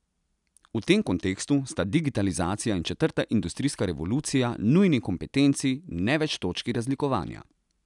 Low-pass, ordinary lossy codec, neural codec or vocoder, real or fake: 10.8 kHz; none; none; real